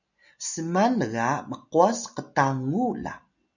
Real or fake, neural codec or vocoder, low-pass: real; none; 7.2 kHz